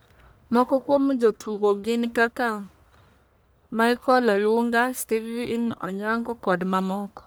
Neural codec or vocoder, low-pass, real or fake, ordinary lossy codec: codec, 44.1 kHz, 1.7 kbps, Pupu-Codec; none; fake; none